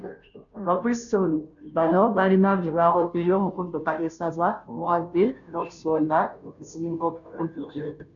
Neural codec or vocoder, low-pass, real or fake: codec, 16 kHz, 0.5 kbps, FunCodec, trained on Chinese and English, 25 frames a second; 7.2 kHz; fake